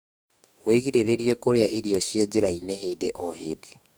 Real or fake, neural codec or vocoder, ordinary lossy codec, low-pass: fake; codec, 44.1 kHz, 2.6 kbps, DAC; none; none